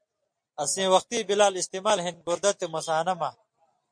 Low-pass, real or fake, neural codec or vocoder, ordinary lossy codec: 9.9 kHz; real; none; MP3, 48 kbps